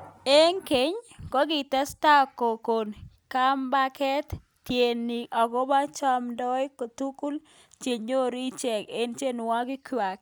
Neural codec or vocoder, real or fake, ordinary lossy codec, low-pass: none; real; none; none